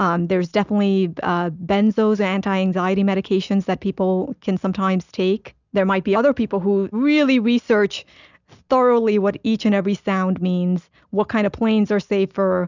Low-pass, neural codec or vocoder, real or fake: 7.2 kHz; none; real